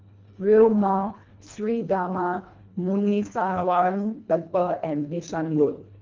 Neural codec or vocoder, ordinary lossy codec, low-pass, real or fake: codec, 24 kHz, 1.5 kbps, HILCodec; Opus, 32 kbps; 7.2 kHz; fake